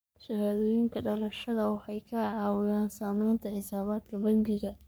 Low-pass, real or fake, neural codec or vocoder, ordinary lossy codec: none; fake; codec, 44.1 kHz, 3.4 kbps, Pupu-Codec; none